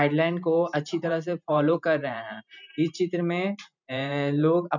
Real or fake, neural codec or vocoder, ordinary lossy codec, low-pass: fake; vocoder, 44.1 kHz, 128 mel bands every 512 samples, BigVGAN v2; none; 7.2 kHz